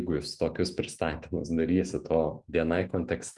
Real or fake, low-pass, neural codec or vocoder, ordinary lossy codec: fake; 10.8 kHz; autoencoder, 48 kHz, 128 numbers a frame, DAC-VAE, trained on Japanese speech; Opus, 24 kbps